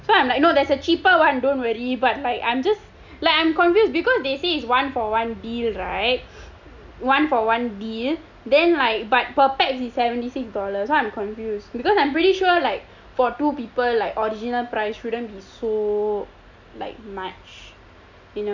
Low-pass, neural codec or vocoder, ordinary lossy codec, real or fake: 7.2 kHz; none; none; real